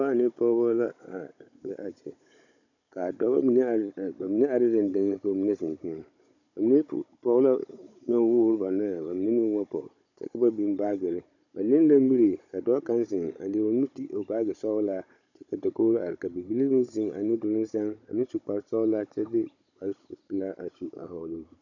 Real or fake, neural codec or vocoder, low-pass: fake; codec, 16 kHz, 16 kbps, FunCodec, trained on Chinese and English, 50 frames a second; 7.2 kHz